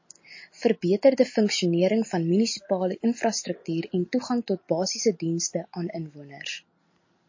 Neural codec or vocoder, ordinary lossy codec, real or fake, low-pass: none; MP3, 32 kbps; real; 7.2 kHz